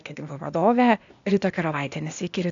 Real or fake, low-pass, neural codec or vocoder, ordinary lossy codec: fake; 7.2 kHz; codec, 16 kHz, 0.8 kbps, ZipCodec; AAC, 64 kbps